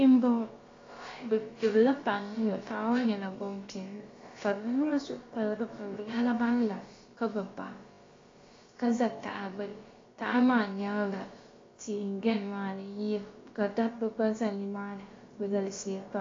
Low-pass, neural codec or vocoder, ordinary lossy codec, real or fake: 7.2 kHz; codec, 16 kHz, about 1 kbps, DyCAST, with the encoder's durations; AAC, 32 kbps; fake